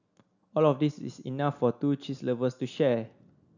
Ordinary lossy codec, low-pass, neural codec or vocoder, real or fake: none; 7.2 kHz; none; real